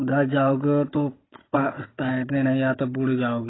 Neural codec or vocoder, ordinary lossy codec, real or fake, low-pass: codec, 16 kHz, 16 kbps, FunCodec, trained on Chinese and English, 50 frames a second; AAC, 16 kbps; fake; 7.2 kHz